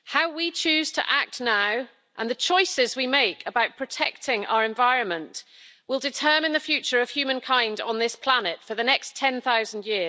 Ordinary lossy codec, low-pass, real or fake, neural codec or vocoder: none; none; real; none